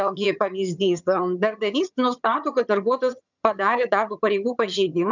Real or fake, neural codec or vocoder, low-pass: fake; vocoder, 22.05 kHz, 80 mel bands, HiFi-GAN; 7.2 kHz